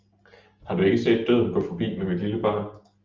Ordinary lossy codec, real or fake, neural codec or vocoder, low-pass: Opus, 32 kbps; real; none; 7.2 kHz